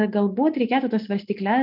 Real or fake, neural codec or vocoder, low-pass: real; none; 5.4 kHz